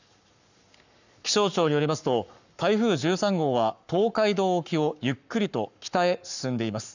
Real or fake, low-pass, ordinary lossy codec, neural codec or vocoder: fake; 7.2 kHz; none; codec, 44.1 kHz, 7.8 kbps, Pupu-Codec